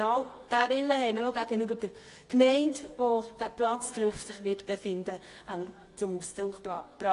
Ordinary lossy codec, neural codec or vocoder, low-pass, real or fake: AAC, 48 kbps; codec, 24 kHz, 0.9 kbps, WavTokenizer, medium music audio release; 10.8 kHz; fake